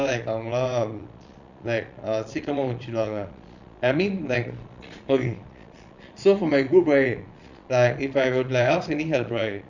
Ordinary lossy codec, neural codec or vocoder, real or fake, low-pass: none; vocoder, 22.05 kHz, 80 mel bands, Vocos; fake; 7.2 kHz